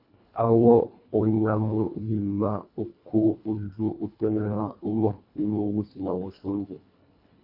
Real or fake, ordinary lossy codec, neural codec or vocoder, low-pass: fake; AAC, 48 kbps; codec, 24 kHz, 1.5 kbps, HILCodec; 5.4 kHz